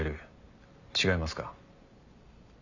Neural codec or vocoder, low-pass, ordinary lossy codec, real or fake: none; 7.2 kHz; Opus, 64 kbps; real